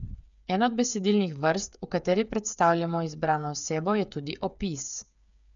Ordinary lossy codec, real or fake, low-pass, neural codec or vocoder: none; fake; 7.2 kHz; codec, 16 kHz, 8 kbps, FreqCodec, smaller model